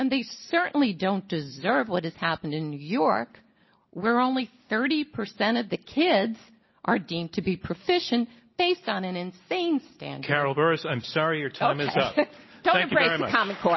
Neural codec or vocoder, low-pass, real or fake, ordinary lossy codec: none; 7.2 kHz; real; MP3, 24 kbps